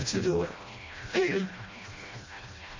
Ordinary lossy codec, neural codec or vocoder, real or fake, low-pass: MP3, 32 kbps; codec, 16 kHz, 1 kbps, FreqCodec, smaller model; fake; 7.2 kHz